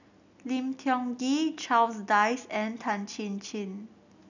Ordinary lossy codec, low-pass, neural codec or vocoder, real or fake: none; 7.2 kHz; none; real